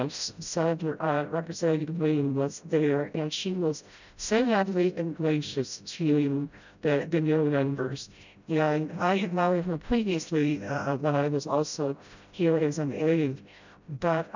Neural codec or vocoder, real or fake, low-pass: codec, 16 kHz, 0.5 kbps, FreqCodec, smaller model; fake; 7.2 kHz